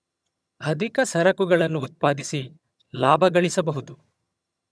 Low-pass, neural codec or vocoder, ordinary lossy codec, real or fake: none; vocoder, 22.05 kHz, 80 mel bands, HiFi-GAN; none; fake